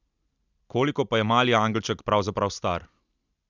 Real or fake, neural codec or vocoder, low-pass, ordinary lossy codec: real; none; 7.2 kHz; none